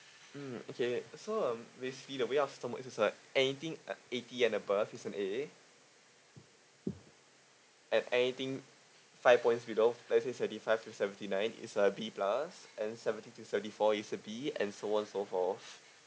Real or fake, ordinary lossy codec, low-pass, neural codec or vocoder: real; none; none; none